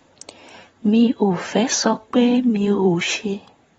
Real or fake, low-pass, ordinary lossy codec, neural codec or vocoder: fake; 19.8 kHz; AAC, 24 kbps; vocoder, 44.1 kHz, 128 mel bands, Pupu-Vocoder